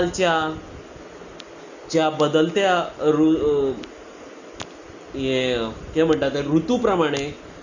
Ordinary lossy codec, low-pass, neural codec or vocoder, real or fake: none; 7.2 kHz; none; real